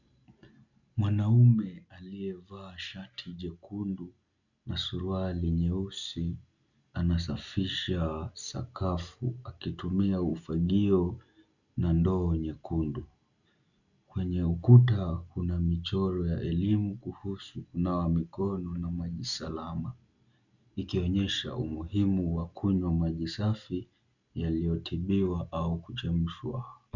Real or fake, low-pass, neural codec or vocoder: real; 7.2 kHz; none